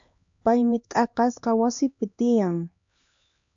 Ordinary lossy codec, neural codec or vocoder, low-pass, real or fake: AAC, 64 kbps; codec, 16 kHz, 2 kbps, X-Codec, HuBERT features, trained on LibriSpeech; 7.2 kHz; fake